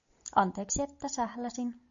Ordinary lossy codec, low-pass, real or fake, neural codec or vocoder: MP3, 64 kbps; 7.2 kHz; real; none